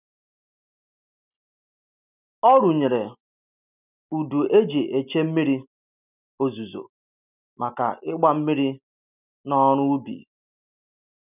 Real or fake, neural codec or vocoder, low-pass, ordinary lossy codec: real; none; 3.6 kHz; none